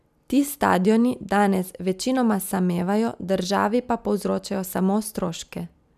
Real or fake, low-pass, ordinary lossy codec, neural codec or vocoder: real; 14.4 kHz; none; none